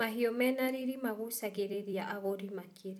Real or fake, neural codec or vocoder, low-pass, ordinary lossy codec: fake; vocoder, 44.1 kHz, 128 mel bands every 256 samples, BigVGAN v2; 19.8 kHz; none